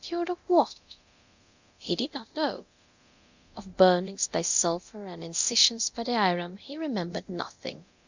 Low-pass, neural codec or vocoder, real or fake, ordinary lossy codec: 7.2 kHz; codec, 24 kHz, 0.9 kbps, DualCodec; fake; Opus, 64 kbps